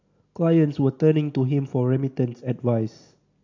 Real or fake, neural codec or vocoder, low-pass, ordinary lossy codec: real; none; 7.2 kHz; AAC, 48 kbps